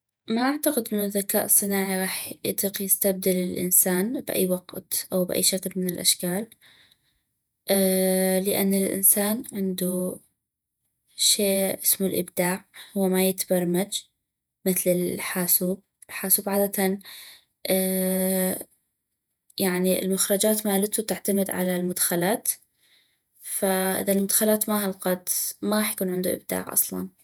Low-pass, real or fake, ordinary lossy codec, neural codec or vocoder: none; fake; none; vocoder, 48 kHz, 128 mel bands, Vocos